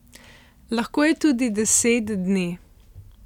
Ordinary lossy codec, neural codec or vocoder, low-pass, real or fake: none; none; 19.8 kHz; real